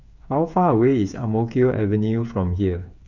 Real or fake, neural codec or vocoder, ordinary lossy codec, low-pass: fake; codec, 16 kHz, 8 kbps, FreqCodec, smaller model; none; 7.2 kHz